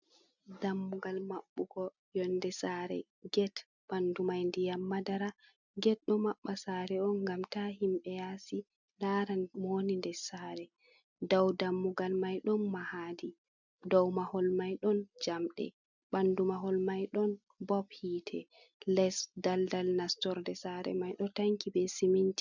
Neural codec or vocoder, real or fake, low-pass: none; real; 7.2 kHz